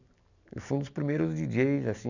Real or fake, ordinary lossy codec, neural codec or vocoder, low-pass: real; none; none; 7.2 kHz